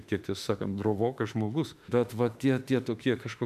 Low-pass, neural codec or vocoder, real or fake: 14.4 kHz; autoencoder, 48 kHz, 32 numbers a frame, DAC-VAE, trained on Japanese speech; fake